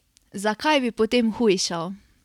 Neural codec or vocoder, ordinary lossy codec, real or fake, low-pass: none; none; real; 19.8 kHz